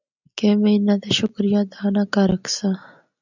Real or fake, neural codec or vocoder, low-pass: real; none; 7.2 kHz